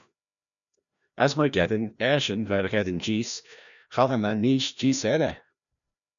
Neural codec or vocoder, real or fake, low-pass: codec, 16 kHz, 1 kbps, FreqCodec, larger model; fake; 7.2 kHz